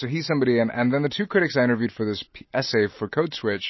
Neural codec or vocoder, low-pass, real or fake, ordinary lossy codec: none; 7.2 kHz; real; MP3, 24 kbps